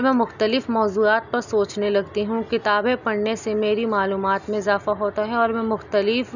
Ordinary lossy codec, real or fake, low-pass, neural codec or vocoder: none; real; 7.2 kHz; none